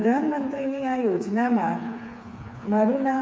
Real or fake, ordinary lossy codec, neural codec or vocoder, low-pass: fake; none; codec, 16 kHz, 4 kbps, FreqCodec, smaller model; none